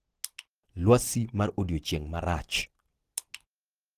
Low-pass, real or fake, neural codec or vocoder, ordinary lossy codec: 14.4 kHz; real; none; Opus, 16 kbps